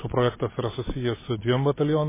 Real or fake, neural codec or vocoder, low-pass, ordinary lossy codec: real; none; 3.6 kHz; MP3, 16 kbps